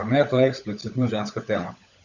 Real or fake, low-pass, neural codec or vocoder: fake; 7.2 kHz; codec, 16 kHz, 16 kbps, FunCodec, trained on LibriTTS, 50 frames a second